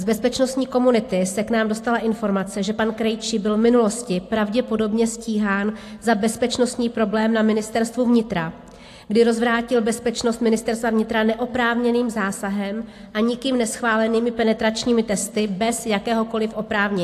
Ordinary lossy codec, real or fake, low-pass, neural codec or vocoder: AAC, 64 kbps; real; 14.4 kHz; none